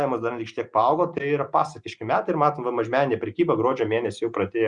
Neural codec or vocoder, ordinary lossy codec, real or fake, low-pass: none; Opus, 64 kbps; real; 10.8 kHz